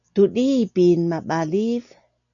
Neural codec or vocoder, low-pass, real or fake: none; 7.2 kHz; real